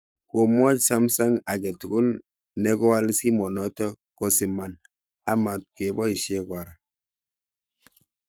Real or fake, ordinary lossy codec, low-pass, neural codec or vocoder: fake; none; none; codec, 44.1 kHz, 7.8 kbps, Pupu-Codec